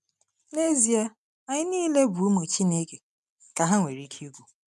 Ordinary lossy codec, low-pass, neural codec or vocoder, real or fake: none; none; none; real